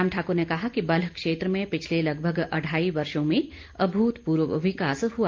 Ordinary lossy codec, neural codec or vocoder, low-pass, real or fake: Opus, 32 kbps; none; 7.2 kHz; real